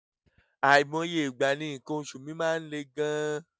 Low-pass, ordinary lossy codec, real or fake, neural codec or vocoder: none; none; real; none